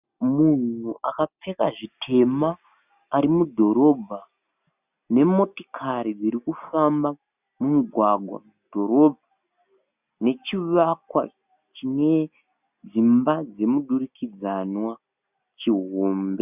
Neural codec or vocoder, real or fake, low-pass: none; real; 3.6 kHz